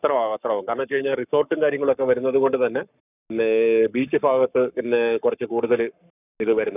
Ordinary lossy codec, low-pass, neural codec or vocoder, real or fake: none; 3.6 kHz; codec, 44.1 kHz, 7.8 kbps, Pupu-Codec; fake